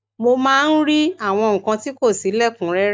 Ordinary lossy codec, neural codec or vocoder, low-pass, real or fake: none; none; none; real